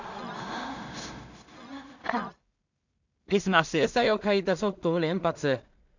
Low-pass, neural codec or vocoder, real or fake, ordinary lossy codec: 7.2 kHz; codec, 16 kHz in and 24 kHz out, 0.4 kbps, LongCat-Audio-Codec, two codebook decoder; fake; none